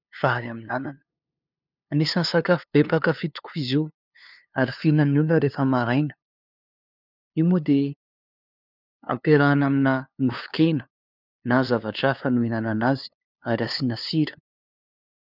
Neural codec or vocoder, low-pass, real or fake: codec, 16 kHz, 2 kbps, FunCodec, trained on LibriTTS, 25 frames a second; 5.4 kHz; fake